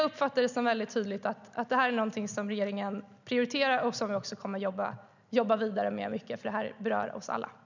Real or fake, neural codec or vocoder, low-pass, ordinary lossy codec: real; none; 7.2 kHz; none